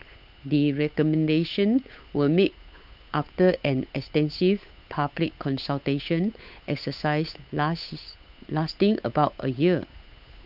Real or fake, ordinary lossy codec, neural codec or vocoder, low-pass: fake; none; codec, 16 kHz, 8 kbps, FunCodec, trained on Chinese and English, 25 frames a second; 5.4 kHz